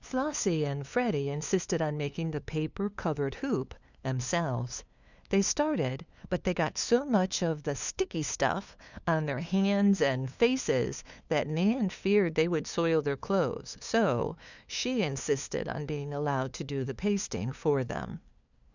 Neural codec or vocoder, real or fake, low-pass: codec, 16 kHz, 2 kbps, FunCodec, trained on LibriTTS, 25 frames a second; fake; 7.2 kHz